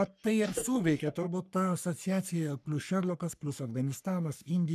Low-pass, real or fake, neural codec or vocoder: 14.4 kHz; fake; codec, 44.1 kHz, 3.4 kbps, Pupu-Codec